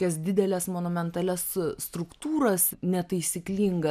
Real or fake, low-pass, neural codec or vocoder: real; 14.4 kHz; none